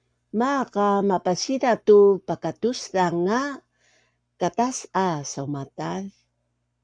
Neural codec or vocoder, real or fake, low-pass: codec, 44.1 kHz, 7.8 kbps, Pupu-Codec; fake; 9.9 kHz